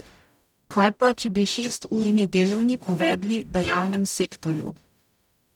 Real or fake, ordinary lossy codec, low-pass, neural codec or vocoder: fake; none; 19.8 kHz; codec, 44.1 kHz, 0.9 kbps, DAC